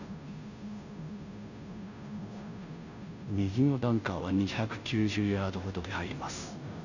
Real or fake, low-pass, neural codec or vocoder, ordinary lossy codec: fake; 7.2 kHz; codec, 16 kHz, 0.5 kbps, FunCodec, trained on Chinese and English, 25 frames a second; none